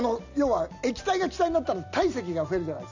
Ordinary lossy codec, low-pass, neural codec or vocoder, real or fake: none; 7.2 kHz; none; real